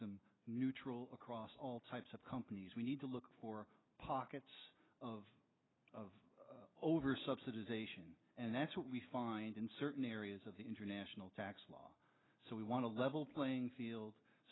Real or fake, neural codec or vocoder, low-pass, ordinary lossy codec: real; none; 7.2 kHz; AAC, 16 kbps